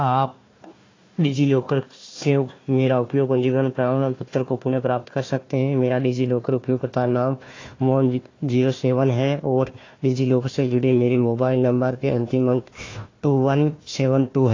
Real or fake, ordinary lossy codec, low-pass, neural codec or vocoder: fake; AAC, 32 kbps; 7.2 kHz; codec, 16 kHz, 1 kbps, FunCodec, trained on Chinese and English, 50 frames a second